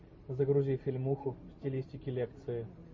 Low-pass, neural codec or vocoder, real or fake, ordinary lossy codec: 7.2 kHz; none; real; MP3, 32 kbps